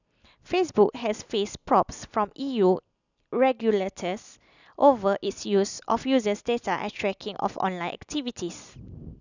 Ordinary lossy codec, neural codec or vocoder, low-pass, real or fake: none; none; 7.2 kHz; real